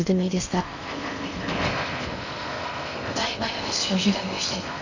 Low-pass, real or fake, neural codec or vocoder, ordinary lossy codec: 7.2 kHz; fake; codec, 16 kHz in and 24 kHz out, 0.6 kbps, FocalCodec, streaming, 4096 codes; none